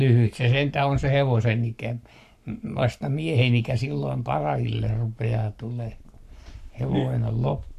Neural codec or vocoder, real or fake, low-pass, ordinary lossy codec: codec, 44.1 kHz, 7.8 kbps, Pupu-Codec; fake; 14.4 kHz; none